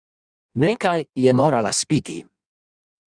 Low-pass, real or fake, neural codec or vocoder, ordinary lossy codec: 9.9 kHz; fake; codec, 16 kHz in and 24 kHz out, 1.1 kbps, FireRedTTS-2 codec; Opus, 64 kbps